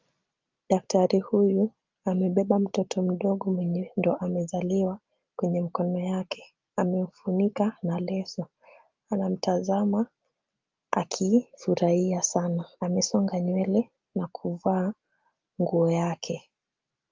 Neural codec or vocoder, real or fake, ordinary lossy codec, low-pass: none; real; Opus, 32 kbps; 7.2 kHz